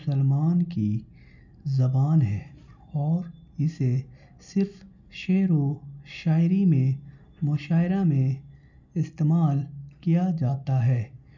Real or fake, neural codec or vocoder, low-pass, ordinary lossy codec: real; none; 7.2 kHz; none